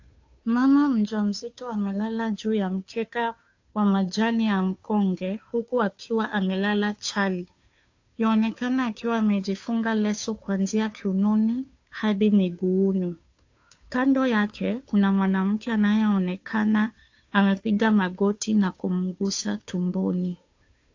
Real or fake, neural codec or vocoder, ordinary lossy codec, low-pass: fake; codec, 16 kHz, 2 kbps, FunCodec, trained on Chinese and English, 25 frames a second; AAC, 48 kbps; 7.2 kHz